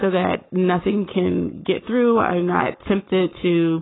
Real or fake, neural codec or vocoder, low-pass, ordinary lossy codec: fake; codec, 44.1 kHz, 7.8 kbps, Pupu-Codec; 7.2 kHz; AAC, 16 kbps